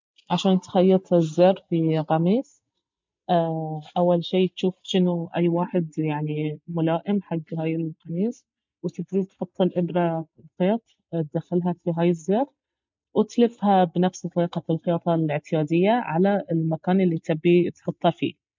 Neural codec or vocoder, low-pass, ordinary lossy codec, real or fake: none; 7.2 kHz; MP3, 64 kbps; real